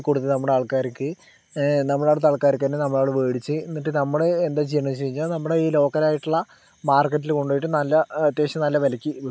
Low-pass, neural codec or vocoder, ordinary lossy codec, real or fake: none; none; none; real